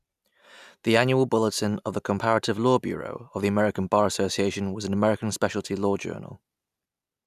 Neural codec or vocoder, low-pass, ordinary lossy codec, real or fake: none; 14.4 kHz; none; real